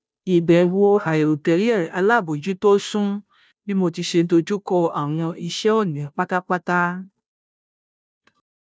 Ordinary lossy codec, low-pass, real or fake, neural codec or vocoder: none; none; fake; codec, 16 kHz, 0.5 kbps, FunCodec, trained on Chinese and English, 25 frames a second